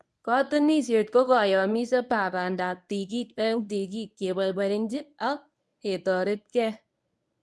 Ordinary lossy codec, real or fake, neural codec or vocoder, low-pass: none; fake; codec, 24 kHz, 0.9 kbps, WavTokenizer, medium speech release version 1; none